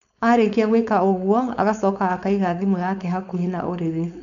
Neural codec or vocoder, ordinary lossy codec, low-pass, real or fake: codec, 16 kHz, 4.8 kbps, FACodec; none; 7.2 kHz; fake